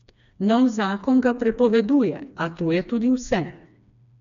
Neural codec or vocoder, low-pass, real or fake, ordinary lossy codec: codec, 16 kHz, 2 kbps, FreqCodec, smaller model; 7.2 kHz; fake; none